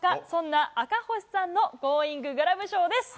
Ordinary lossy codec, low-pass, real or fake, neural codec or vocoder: none; none; real; none